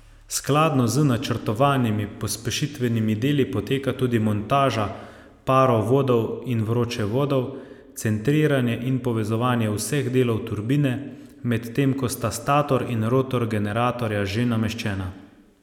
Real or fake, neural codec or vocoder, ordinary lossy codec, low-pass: real; none; none; 19.8 kHz